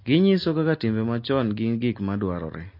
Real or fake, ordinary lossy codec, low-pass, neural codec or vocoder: real; MP3, 32 kbps; 5.4 kHz; none